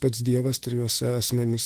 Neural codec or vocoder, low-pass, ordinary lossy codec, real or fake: autoencoder, 48 kHz, 32 numbers a frame, DAC-VAE, trained on Japanese speech; 14.4 kHz; Opus, 16 kbps; fake